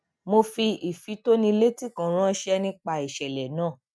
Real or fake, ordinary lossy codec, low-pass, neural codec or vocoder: real; none; none; none